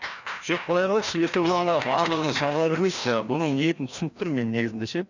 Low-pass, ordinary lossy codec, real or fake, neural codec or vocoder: 7.2 kHz; none; fake; codec, 16 kHz, 1 kbps, FreqCodec, larger model